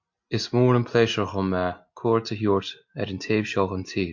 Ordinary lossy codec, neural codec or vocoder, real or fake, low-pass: MP3, 48 kbps; none; real; 7.2 kHz